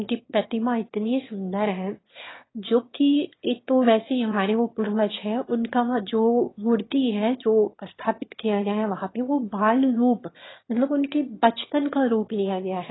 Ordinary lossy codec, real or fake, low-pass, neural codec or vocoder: AAC, 16 kbps; fake; 7.2 kHz; autoencoder, 22.05 kHz, a latent of 192 numbers a frame, VITS, trained on one speaker